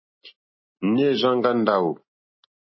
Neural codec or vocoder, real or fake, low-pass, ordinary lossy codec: none; real; 7.2 kHz; MP3, 24 kbps